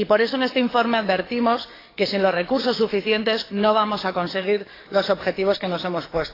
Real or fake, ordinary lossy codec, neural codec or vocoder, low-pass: fake; AAC, 24 kbps; codec, 44.1 kHz, 7.8 kbps, DAC; 5.4 kHz